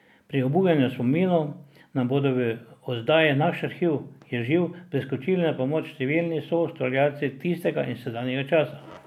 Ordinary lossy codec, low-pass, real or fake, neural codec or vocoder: none; 19.8 kHz; real; none